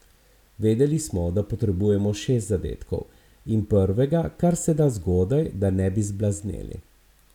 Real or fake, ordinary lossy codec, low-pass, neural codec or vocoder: real; none; 19.8 kHz; none